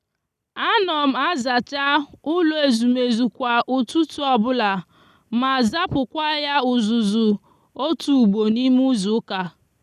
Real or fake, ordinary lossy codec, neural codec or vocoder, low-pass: real; none; none; 14.4 kHz